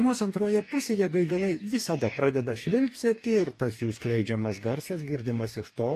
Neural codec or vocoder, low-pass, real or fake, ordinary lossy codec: codec, 44.1 kHz, 2.6 kbps, DAC; 14.4 kHz; fake; AAC, 64 kbps